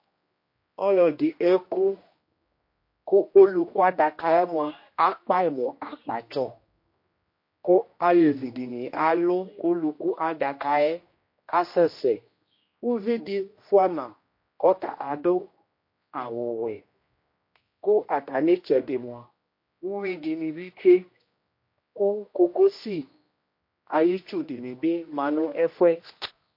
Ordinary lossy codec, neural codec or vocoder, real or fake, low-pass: MP3, 32 kbps; codec, 16 kHz, 1 kbps, X-Codec, HuBERT features, trained on general audio; fake; 5.4 kHz